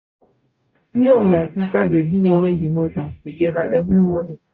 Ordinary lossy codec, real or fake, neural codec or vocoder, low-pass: Opus, 64 kbps; fake; codec, 44.1 kHz, 0.9 kbps, DAC; 7.2 kHz